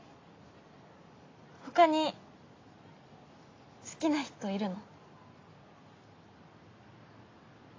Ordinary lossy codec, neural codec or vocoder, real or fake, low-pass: AAC, 32 kbps; none; real; 7.2 kHz